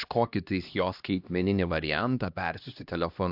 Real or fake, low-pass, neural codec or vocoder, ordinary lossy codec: fake; 5.4 kHz; codec, 16 kHz, 1 kbps, X-Codec, HuBERT features, trained on LibriSpeech; AAC, 48 kbps